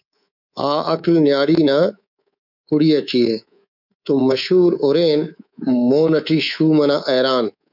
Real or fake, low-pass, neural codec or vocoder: fake; 5.4 kHz; codec, 24 kHz, 3.1 kbps, DualCodec